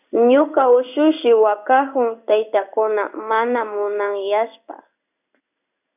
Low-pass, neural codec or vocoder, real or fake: 3.6 kHz; codec, 16 kHz in and 24 kHz out, 1 kbps, XY-Tokenizer; fake